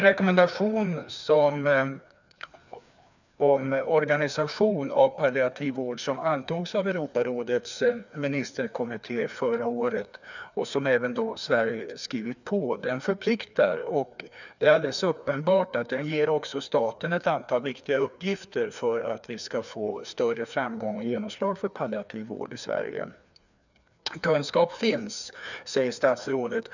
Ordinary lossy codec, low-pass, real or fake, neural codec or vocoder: none; 7.2 kHz; fake; codec, 16 kHz, 2 kbps, FreqCodec, larger model